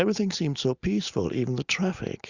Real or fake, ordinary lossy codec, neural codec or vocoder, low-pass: real; Opus, 64 kbps; none; 7.2 kHz